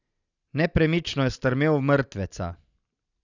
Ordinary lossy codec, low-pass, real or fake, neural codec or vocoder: none; 7.2 kHz; real; none